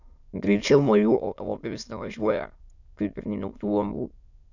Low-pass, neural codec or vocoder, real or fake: 7.2 kHz; autoencoder, 22.05 kHz, a latent of 192 numbers a frame, VITS, trained on many speakers; fake